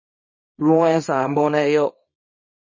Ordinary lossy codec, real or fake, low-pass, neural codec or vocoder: MP3, 32 kbps; fake; 7.2 kHz; codec, 24 kHz, 0.9 kbps, WavTokenizer, small release